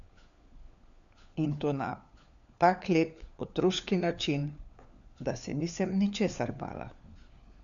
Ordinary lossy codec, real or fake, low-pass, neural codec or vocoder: none; fake; 7.2 kHz; codec, 16 kHz, 4 kbps, FunCodec, trained on LibriTTS, 50 frames a second